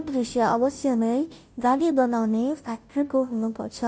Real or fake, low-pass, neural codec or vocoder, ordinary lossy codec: fake; none; codec, 16 kHz, 0.5 kbps, FunCodec, trained on Chinese and English, 25 frames a second; none